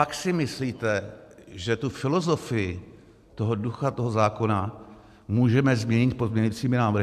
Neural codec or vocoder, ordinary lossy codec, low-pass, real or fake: none; MP3, 96 kbps; 14.4 kHz; real